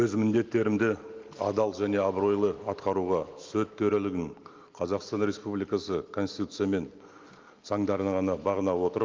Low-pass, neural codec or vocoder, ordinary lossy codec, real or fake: 7.2 kHz; none; Opus, 24 kbps; real